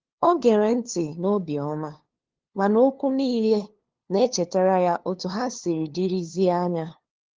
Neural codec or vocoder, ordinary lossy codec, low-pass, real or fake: codec, 16 kHz, 2 kbps, FunCodec, trained on LibriTTS, 25 frames a second; Opus, 16 kbps; 7.2 kHz; fake